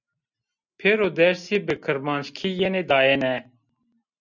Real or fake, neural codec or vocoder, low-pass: real; none; 7.2 kHz